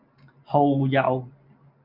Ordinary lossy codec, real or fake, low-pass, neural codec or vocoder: MP3, 48 kbps; real; 5.4 kHz; none